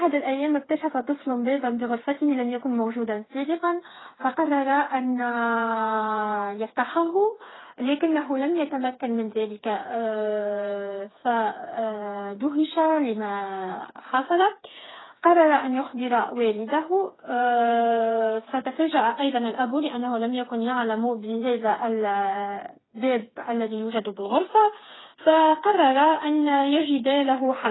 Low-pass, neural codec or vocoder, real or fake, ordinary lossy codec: 7.2 kHz; codec, 16 kHz, 4 kbps, FreqCodec, smaller model; fake; AAC, 16 kbps